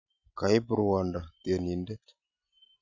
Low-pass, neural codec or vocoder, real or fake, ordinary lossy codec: 7.2 kHz; none; real; none